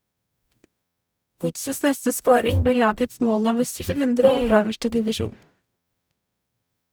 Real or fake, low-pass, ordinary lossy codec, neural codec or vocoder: fake; none; none; codec, 44.1 kHz, 0.9 kbps, DAC